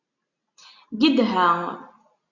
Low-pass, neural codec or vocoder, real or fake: 7.2 kHz; none; real